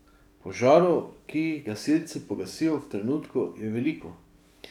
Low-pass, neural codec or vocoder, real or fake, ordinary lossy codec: 19.8 kHz; codec, 44.1 kHz, 7.8 kbps, DAC; fake; none